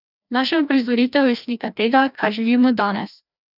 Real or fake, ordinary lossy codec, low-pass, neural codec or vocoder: fake; none; 5.4 kHz; codec, 16 kHz, 1 kbps, FreqCodec, larger model